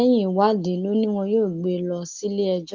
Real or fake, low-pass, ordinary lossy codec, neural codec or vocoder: real; 7.2 kHz; Opus, 32 kbps; none